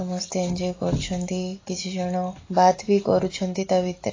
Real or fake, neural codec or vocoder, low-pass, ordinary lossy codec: real; none; 7.2 kHz; AAC, 32 kbps